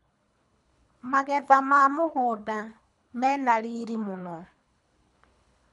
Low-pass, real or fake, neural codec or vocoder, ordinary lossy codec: 10.8 kHz; fake; codec, 24 kHz, 3 kbps, HILCodec; none